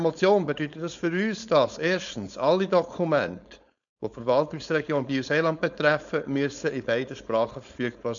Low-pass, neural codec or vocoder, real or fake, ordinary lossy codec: 7.2 kHz; codec, 16 kHz, 4.8 kbps, FACodec; fake; none